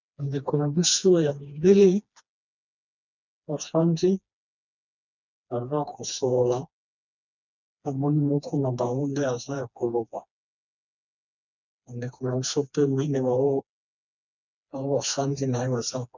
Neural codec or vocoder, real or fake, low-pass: codec, 16 kHz, 2 kbps, FreqCodec, smaller model; fake; 7.2 kHz